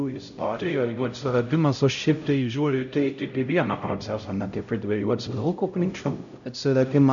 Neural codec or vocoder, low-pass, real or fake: codec, 16 kHz, 0.5 kbps, X-Codec, HuBERT features, trained on LibriSpeech; 7.2 kHz; fake